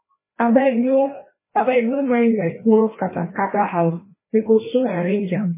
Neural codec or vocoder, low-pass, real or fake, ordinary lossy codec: codec, 16 kHz, 1 kbps, FreqCodec, larger model; 3.6 kHz; fake; MP3, 16 kbps